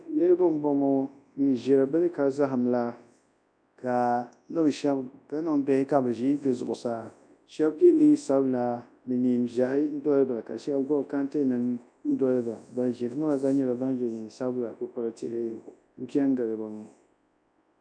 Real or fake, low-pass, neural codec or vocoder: fake; 9.9 kHz; codec, 24 kHz, 0.9 kbps, WavTokenizer, large speech release